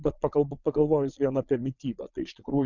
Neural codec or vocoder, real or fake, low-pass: vocoder, 22.05 kHz, 80 mel bands, Vocos; fake; 7.2 kHz